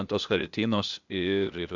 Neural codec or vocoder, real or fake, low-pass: codec, 16 kHz, 0.8 kbps, ZipCodec; fake; 7.2 kHz